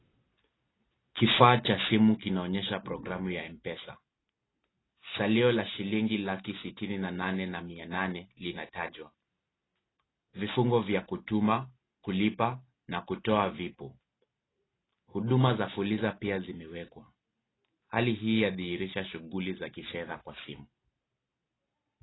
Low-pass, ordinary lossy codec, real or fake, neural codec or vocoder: 7.2 kHz; AAC, 16 kbps; real; none